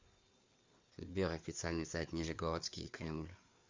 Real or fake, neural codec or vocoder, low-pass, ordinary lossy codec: fake; codec, 16 kHz, 4 kbps, FreqCodec, larger model; 7.2 kHz; MP3, 64 kbps